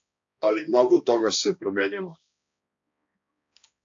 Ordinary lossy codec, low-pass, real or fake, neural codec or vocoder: AAC, 64 kbps; 7.2 kHz; fake; codec, 16 kHz, 1 kbps, X-Codec, HuBERT features, trained on balanced general audio